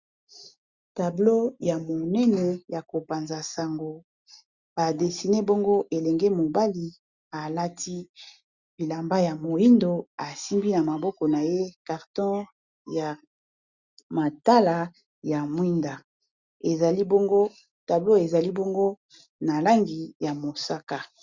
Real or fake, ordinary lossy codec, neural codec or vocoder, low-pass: real; Opus, 64 kbps; none; 7.2 kHz